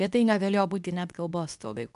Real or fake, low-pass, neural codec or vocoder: fake; 10.8 kHz; codec, 24 kHz, 0.9 kbps, WavTokenizer, medium speech release version 2